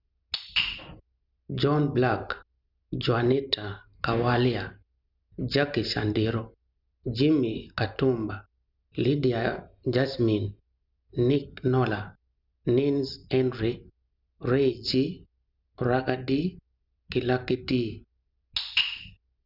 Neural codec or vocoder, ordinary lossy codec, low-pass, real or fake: none; none; 5.4 kHz; real